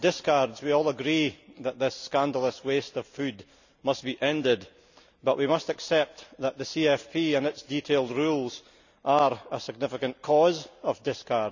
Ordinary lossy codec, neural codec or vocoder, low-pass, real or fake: none; none; 7.2 kHz; real